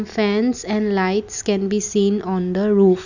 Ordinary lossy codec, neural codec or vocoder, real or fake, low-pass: none; none; real; 7.2 kHz